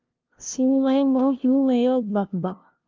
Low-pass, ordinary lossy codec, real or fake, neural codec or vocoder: 7.2 kHz; Opus, 32 kbps; fake; codec, 16 kHz, 0.5 kbps, FunCodec, trained on LibriTTS, 25 frames a second